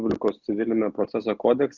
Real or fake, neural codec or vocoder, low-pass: real; none; 7.2 kHz